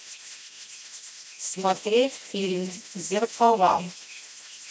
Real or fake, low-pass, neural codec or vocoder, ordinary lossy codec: fake; none; codec, 16 kHz, 1 kbps, FreqCodec, smaller model; none